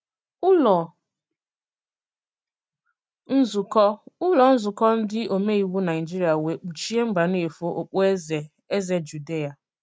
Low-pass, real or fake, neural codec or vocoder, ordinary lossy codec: none; real; none; none